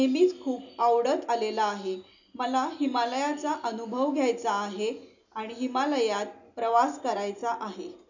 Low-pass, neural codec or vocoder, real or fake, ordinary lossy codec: 7.2 kHz; none; real; none